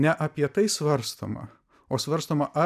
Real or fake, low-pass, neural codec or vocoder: real; 14.4 kHz; none